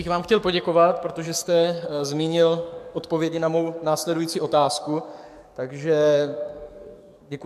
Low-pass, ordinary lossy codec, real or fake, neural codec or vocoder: 14.4 kHz; MP3, 96 kbps; fake; codec, 44.1 kHz, 7.8 kbps, DAC